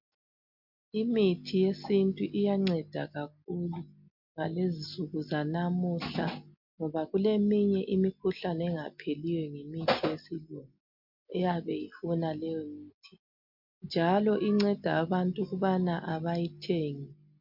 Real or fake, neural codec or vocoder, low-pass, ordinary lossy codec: real; none; 5.4 kHz; MP3, 48 kbps